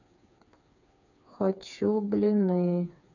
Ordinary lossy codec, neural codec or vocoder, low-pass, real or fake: none; codec, 16 kHz, 4 kbps, FreqCodec, smaller model; 7.2 kHz; fake